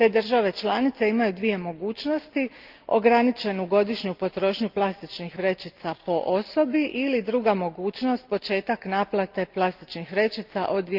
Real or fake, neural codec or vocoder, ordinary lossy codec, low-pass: real; none; Opus, 32 kbps; 5.4 kHz